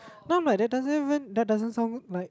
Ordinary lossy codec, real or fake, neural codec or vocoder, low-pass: none; real; none; none